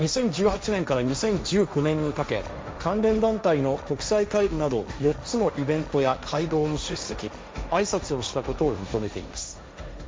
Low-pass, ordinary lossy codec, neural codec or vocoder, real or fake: none; none; codec, 16 kHz, 1.1 kbps, Voila-Tokenizer; fake